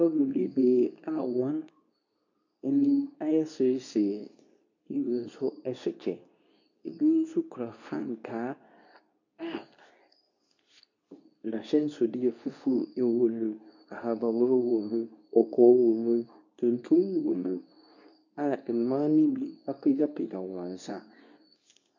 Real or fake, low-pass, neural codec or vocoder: fake; 7.2 kHz; codec, 24 kHz, 0.9 kbps, WavTokenizer, medium speech release version 2